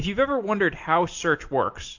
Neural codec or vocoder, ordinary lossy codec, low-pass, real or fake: none; AAC, 48 kbps; 7.2 kHz; real